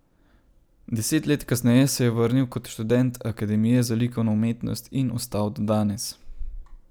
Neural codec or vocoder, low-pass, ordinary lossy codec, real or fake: none; none; none; real